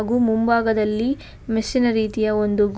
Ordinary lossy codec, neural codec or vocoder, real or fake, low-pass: none; none; real; none